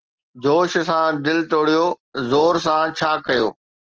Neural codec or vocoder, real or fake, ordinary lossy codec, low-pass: none; real; Opus, 16 kbps; 7.2 kHz